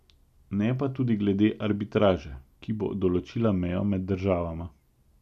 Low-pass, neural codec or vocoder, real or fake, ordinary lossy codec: 14.4 kHz; none; real; none